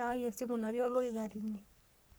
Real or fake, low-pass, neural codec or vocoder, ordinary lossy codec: fake; none; codec, 44.1 kHz, 3.4 kbps, Pupu-Codec; none